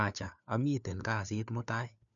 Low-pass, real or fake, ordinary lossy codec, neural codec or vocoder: 7.2 kHz; fake; none; codec, 16 kHz, 4 kbps, FunCodec, trained on LibriTTS, 50 frames a second